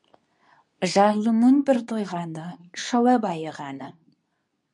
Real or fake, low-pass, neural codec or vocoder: fake; 10.8 kHz; codec, 24 kHz, 0.9 kbps, WavTokenizer, medium speech release version 2